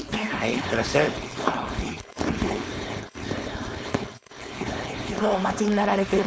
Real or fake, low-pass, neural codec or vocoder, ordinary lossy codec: fake; none; codec, 16 kHz, 4.8 kbps, FACodec; none